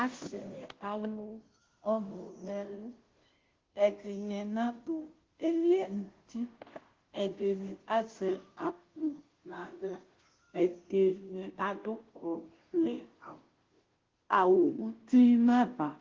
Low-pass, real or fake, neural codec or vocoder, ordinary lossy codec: 7.2 kHz; fake; codec, 16 kHz, 0.5 kbps, FunCodec, trained on Chinese and English, 25 frames a second; Opus, 16 kbps